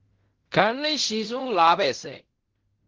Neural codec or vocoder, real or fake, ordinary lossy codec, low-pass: codec, 16 kHz in and 24 kHz out, 0.4 kbps, LongCat-Audio-Codec, fine tuned four codebook decoder; fake; Opus, 16 kbps; 7.2 kHz